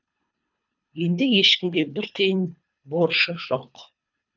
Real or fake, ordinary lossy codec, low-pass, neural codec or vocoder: fake; none; 7.2 kHz; codec, 24 kHz, 3 kbps, HILCodec